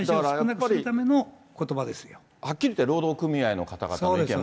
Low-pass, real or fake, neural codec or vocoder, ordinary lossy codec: none; real; none; none